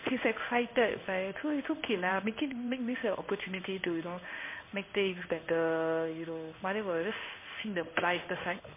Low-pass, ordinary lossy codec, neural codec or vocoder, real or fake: 3.6 kHz; MP3, 24 kbps; codec, 16 kHz in and 24 kHz out, 1 kbps, XY-Tokenizer; fake